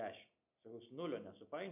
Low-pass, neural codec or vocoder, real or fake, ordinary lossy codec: 3.6 kHz; none; real; MP3, 32 kbps